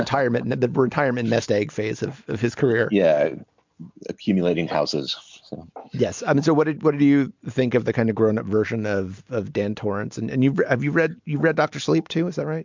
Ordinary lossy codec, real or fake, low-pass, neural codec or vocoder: MP3, 64 kbps; real; 7.2 kHz; none